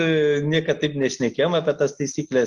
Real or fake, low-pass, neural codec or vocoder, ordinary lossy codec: real; 10.8 kHz; none; Opus, 24 kbps